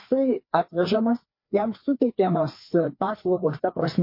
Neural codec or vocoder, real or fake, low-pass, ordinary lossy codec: codec, 16 kHz, 2 kbps, FreqCodec, larger model; fake; 5.4 kHz; MP3, 32 kbps